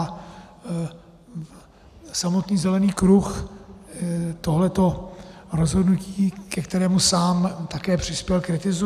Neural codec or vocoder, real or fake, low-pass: none; real; 14.4 kHz